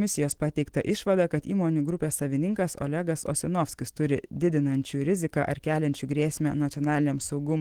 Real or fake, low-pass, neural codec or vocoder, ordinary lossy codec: fake; 19.8 kHz; autoencoder, 48 kHz, 128 numbers a frame, DAC-VAE, trained on Japanese speech; Opus, 16 kbps